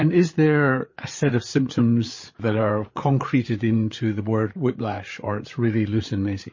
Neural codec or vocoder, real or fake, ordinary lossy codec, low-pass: codec, 16 kHz, 8 kbps, FreqCodec, larger model; fake; MP3, 32 kbps; 7.2 kHz